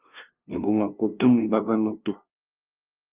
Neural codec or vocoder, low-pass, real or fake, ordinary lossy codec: codec, 16 kHz, 0.5 kbps, FunCodec, trained on LibriTTS, 25 frames a second; 3.6 kHz; fake; Opus, 24 kbps